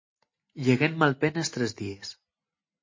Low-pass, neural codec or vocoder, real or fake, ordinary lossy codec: 7.2 kHz; none; real; MP3, 32 kbps